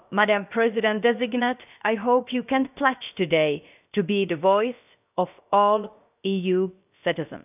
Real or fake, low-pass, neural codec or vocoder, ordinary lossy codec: fake; 3.6 kHz; codec, 16 kHz, about 1 kbps, DyCAST, with the encoder's durations; none